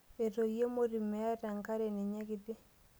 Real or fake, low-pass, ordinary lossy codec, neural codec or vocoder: real; none; none; none